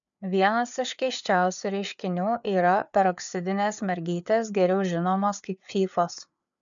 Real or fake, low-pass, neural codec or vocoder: fake; 7.2 kHz; codec, 16 kHz, 4 kbps, FreqCodec, larger model